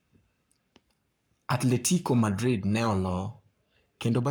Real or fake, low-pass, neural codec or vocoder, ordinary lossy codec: fake; none; codec, 44.1 kHz, 7.8 kbps, Pupu-Codec; none